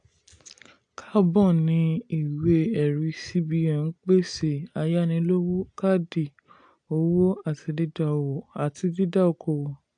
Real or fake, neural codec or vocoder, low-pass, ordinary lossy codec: real; none; 9.9 kHz; AAC, 64 kbps